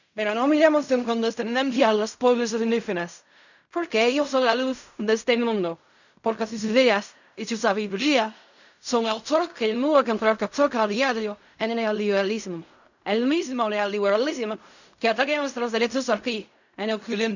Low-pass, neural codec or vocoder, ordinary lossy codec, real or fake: 7.2 kHz; codec, 16 kHz in and 24 kHz out, 0.4 kbps, LongCat-Audio-Codec, fine tuned four codebook decoder; none; fake